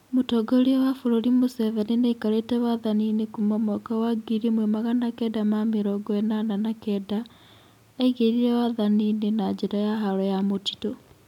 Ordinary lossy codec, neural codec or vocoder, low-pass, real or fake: none; none; 19.8 kHz; real